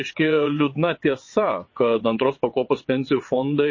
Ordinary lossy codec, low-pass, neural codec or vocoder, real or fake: MP3, 32 kbps; 7.2 kHz; vocoder, 22.05 kHz, 80 mel bands, WaveNeXt; fake